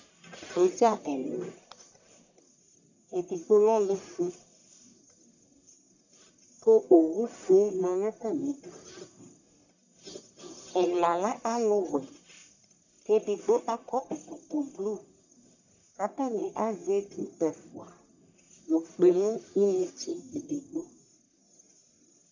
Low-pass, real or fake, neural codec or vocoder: 7.2 kHz; fake; codec, 44.1 kHz, 1.7 kbps, Pupu-Codec